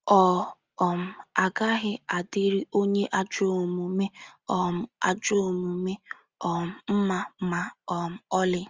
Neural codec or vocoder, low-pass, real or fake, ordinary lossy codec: none; 7.2 kHz; real; Opus, 32 kbps